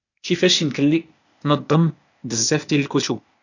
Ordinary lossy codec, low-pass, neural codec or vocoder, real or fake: none; 7.2 kHz; codec, 16 kHz, 0.8 kbps, ZipCodec; fake